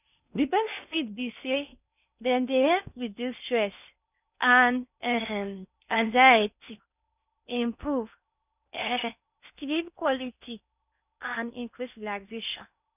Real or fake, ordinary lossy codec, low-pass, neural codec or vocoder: fake; none; 3.6 kHz; codec, 16 kHz in and 24 kHz out, 0.6 kbps, FocalCodec, streaming, 2048 codes